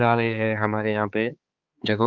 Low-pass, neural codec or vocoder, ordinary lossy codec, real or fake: 7.2 kHz; codec, 16 kHz, 4 kbps, X-Codec, HuBERT features, trained on balanced general audio; Opus, 24 kbps; fake